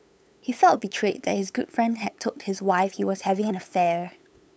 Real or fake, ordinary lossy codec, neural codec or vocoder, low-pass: fake; none; codec, 16 kHz, 8 kbps, FunCodec, trained on LibriTTS, 25 frames a second; none